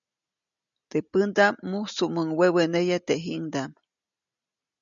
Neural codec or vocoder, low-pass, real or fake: none; 7.2 kHz; real